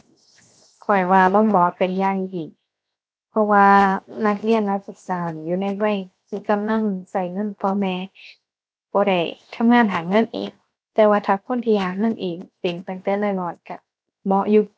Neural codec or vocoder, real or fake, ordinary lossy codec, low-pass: codec, 16 kHz, 0.7 kbps, FocalCodec; fake; none; none